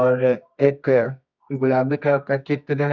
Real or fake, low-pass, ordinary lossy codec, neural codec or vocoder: fake; 7.2 kHz; none; codec, 24 kHz, 0.9 kbps, WavTokenizer, medium music audio release